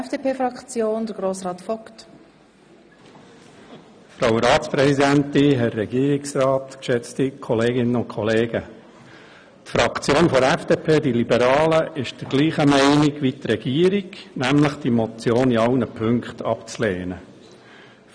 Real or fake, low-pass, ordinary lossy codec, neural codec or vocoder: real; 9.9 kHz; none; none